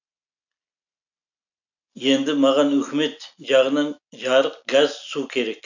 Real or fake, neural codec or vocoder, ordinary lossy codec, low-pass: real; none; none; 7.2 kHz